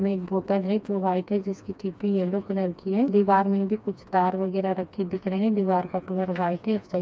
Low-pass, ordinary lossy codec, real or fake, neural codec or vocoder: none; none; fake; codec, 16 kHz, 2 kbps, FreqCodec, smaller model